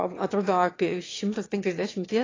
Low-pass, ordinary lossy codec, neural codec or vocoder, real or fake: 7.2 kHz; AAC, 32 kbps; autoencoder, 22.05 kHz, a latent of 192 numbers a frame, VITS, trained on one speaker; fake